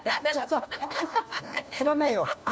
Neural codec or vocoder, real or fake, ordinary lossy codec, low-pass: codec, 16 kHz, 1 kbps, FunCodec, trained on LibriTTS, 50 frames a second; fake; none; none